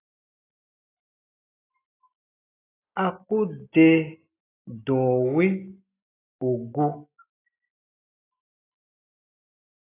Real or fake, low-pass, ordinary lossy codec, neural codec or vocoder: real; 3.6 kHz; AAC, 16 kbps; none